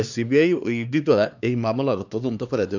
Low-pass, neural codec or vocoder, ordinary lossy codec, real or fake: 7.2 kHz; codec, 16 kHz, 2 kbps, X-Codec, HuBERT features, trained on LibriSpeech; none; fake